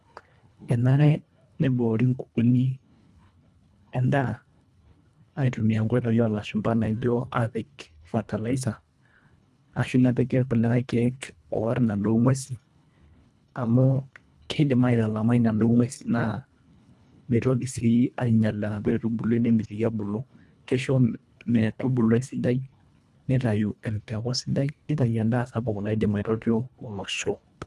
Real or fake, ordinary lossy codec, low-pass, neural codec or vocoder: fake; none; none; codec, 24 kHz, 1.5 kbps, HILCodec